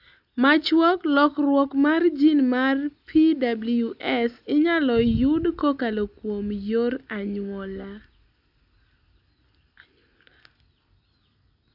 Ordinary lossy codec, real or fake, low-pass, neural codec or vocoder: AAC, 48 kbps; real; 5.4 kHz; none